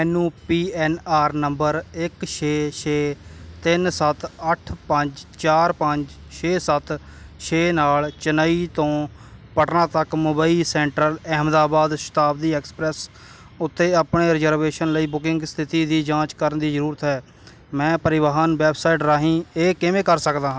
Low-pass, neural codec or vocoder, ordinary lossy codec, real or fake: none; none; none; real